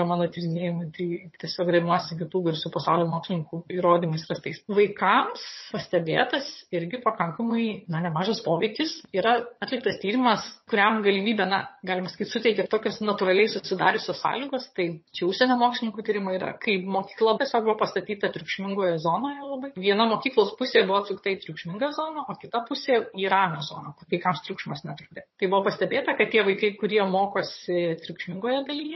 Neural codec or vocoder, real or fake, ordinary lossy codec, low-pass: vocoder, 22.05 kHz, 80 mel bands, HiFi-GAN; fake; MP3, 24 kbps; 7.2 kHz